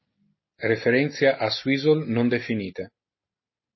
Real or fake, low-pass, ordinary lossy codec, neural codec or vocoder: real; 7.2 kHz; MP3, 24 kbps; none